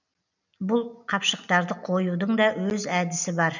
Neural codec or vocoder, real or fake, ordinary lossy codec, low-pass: none; real; none; 7.2 kHz